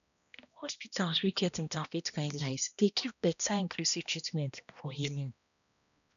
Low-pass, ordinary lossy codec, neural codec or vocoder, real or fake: 7.2 kHz; none; codec, 16 kHz, 1 kbps, X-Codec, HuBERT features, trained on balanced general audio; fake